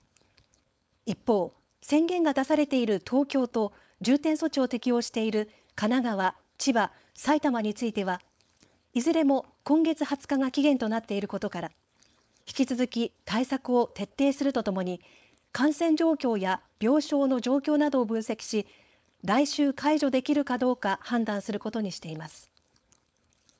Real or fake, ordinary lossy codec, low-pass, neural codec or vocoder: fake; none; none; codec, 16 kHz, 4.8 kbps, FACodec